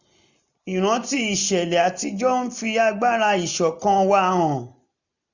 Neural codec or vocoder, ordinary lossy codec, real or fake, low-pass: none; none; real; 7.2 kHz